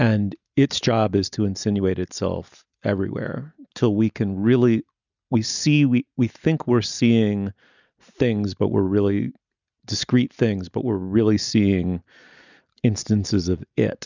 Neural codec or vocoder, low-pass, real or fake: none; 7.2 kHz; real